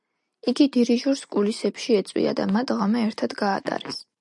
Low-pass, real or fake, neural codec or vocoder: 10.8 kHz; real; none